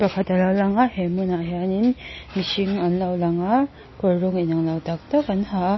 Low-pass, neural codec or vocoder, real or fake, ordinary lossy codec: 7.2 kHz; none; real; MP3, 24 kbps